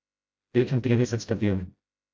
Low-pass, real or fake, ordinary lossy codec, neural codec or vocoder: none; fake; none; codec, 16 kHz, 0.5 kbps, FreqCodec, smaller model